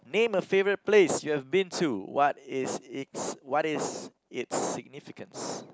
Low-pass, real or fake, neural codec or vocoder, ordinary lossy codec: none; real; none; none